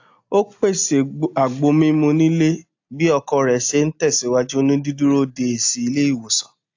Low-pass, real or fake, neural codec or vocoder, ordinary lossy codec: 7.2 kHz; real; none; AAC, 48 kbps